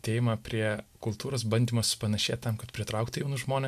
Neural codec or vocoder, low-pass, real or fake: none; 14.4 kHz; real